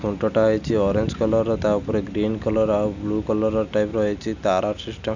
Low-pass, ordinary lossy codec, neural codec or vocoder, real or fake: 7.2 kHz; none; none; real